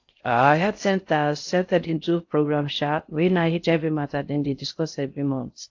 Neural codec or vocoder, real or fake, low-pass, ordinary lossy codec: codec, 16 kHz in and 24 kHz out, 0.6 kbps, FocalCodec, streaming, 4096 codes; fake; 7.2 kHz; AAC, 48 kbps